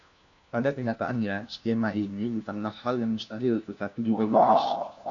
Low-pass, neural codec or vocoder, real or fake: 7.2 kHz; codec, 16 kHz, 1 kbps, FunCodec, trained on LibriTTS, 50 frames a second; fake